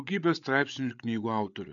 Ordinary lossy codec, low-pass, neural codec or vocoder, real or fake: MP3, 96 kbps; 7.2 kHz; codec, 16 kHz, 8 kbps, FreqCodec, larger model; fake